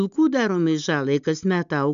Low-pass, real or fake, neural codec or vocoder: 7.2 kHz; real; none